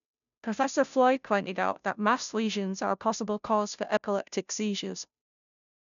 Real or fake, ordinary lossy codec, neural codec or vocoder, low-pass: fake; none; codec, 16 kHz, 0.5 kbps, FunCodec, trained on Chinese and English, 25 frames a second; 7.2 kHz